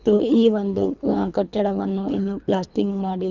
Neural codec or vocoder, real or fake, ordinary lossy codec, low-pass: codec, 24 kHz, 3 kbps, HILCodec; fake; none; 7.2 kHz